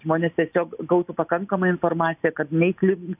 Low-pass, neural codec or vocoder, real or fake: 3.6 kHz; none; real